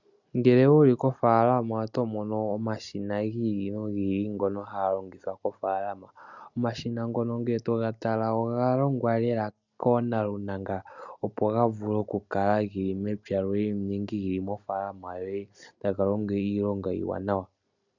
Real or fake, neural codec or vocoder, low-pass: real; none; 7.2 kHz